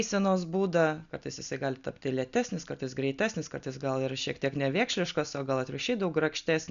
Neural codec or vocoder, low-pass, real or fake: none; 7.2 kHz; real